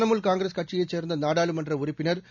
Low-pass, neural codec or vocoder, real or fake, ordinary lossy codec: 7.2 kHz; none; real; none